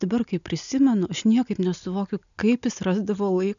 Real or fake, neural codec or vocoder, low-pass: real; none; 7.2 kHz